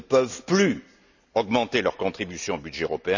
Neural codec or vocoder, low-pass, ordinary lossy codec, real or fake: none; 7.2 kHz; none; real